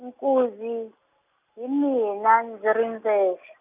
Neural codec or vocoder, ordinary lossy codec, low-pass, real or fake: none; none; 3.6 kHz; real